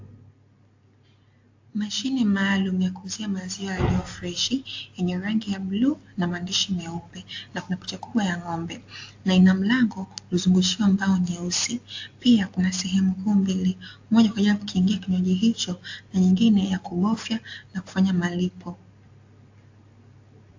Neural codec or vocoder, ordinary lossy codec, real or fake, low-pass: none; AAC, 48 kbps; real; 7.2 kHz